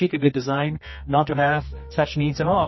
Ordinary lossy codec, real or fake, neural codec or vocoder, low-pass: MP3, 24 kbps; fake; codec, 24 kHz, 0.9 kbps, WavTokenizer, medium music audio release; 7.2 kHz